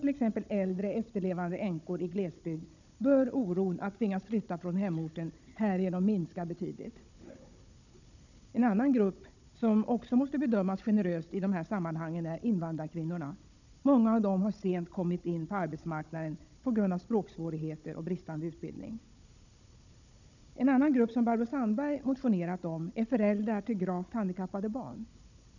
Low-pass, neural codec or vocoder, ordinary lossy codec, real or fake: 7.2 kHz; codec, 16 kHz, 16 kbps, FunCodec, trained on Chinese and English, 50 frames a second; none; fake